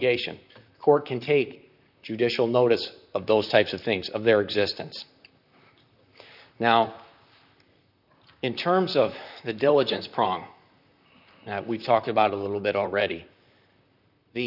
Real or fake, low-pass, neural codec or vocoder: fake; 5.4 kHz; vocoder, 44.1 kHz, 128 mel bands, Pupu-Vocoder